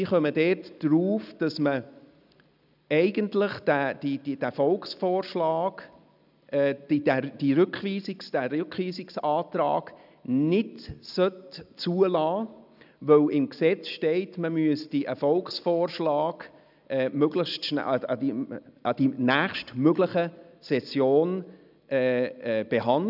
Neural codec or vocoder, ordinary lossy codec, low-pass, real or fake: none; none; 5.4 kHz; real